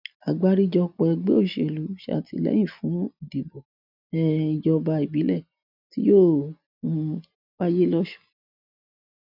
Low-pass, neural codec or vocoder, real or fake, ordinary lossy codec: 5.4 kHz; none; real; none